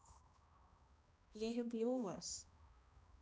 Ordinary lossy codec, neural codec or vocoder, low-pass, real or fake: none; codec, 16 kHz, 1 kbps, X-Codec, HuBERT features, trained on balanced general audio; none; fake